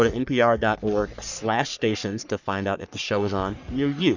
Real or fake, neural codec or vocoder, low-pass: fake; codec, 44.1 kHz, 3.4 kbps, Pupu-Codec; 7.2 kHz